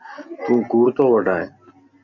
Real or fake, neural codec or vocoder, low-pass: real; none; 7.2 kHz